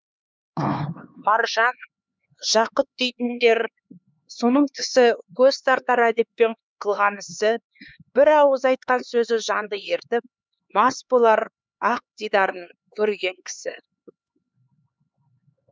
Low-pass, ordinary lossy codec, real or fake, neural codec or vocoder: none; none; fake; codec, 16 kHz, 4 kbps, X-Codec, HuBERT features, trained on LibriSpeech